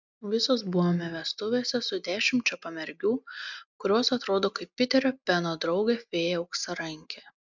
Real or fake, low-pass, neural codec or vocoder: real; 7.2 kHz; none